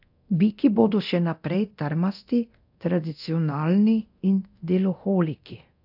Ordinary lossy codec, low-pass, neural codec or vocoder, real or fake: AAC, 48 kbps; 5.4 kHz; codec, 24 kHz, 0.9 kbps, DualCodec; fake